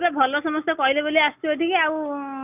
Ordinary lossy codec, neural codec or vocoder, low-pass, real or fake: none; none; 3.6 kHz; real